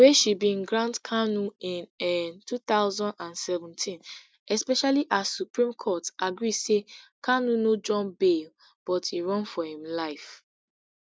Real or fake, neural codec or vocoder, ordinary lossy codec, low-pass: real; none; none; none